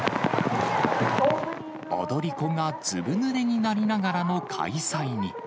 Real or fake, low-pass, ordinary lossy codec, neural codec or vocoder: real; none; none; none